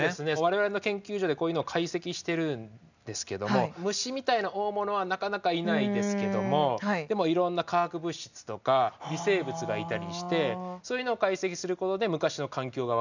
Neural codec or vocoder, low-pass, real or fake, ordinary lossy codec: none; 7.2 kHz; real; none